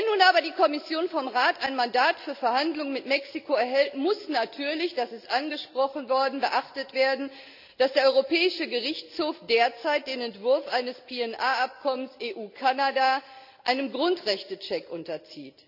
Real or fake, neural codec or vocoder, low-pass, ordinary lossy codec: real; none; 5.4 kHz; none